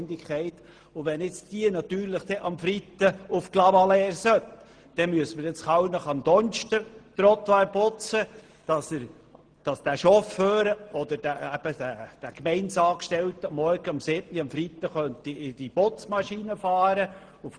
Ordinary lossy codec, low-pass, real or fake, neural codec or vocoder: Opus, 16 kbps; 9.9 kHz; real; none